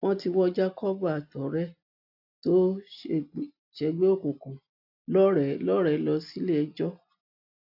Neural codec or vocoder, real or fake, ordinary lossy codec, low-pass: none; real; AAC, 48 kbps; 5.4 kHz